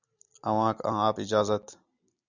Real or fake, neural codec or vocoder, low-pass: real; none; 7.2 kHz